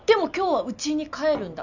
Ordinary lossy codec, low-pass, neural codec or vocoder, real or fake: none; 7.2 kHz; none; real